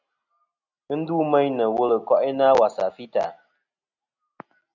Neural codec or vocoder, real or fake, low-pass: none; real; 7.2 kHz